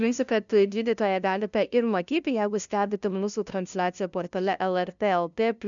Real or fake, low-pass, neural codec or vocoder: fake; 7.2 kHz; codec, 16 kHz, 0.5 kbps, FunCodec, trained on LibriTTS, 25 frames a second